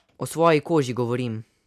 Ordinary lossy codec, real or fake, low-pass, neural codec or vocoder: none; real; 14.4 kHz; none